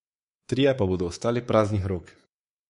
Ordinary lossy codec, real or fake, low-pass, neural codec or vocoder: MP3, 48 kbps; fake; 10.8 kHz; codec, 24 kHz, 3.1 kbps, DualCodec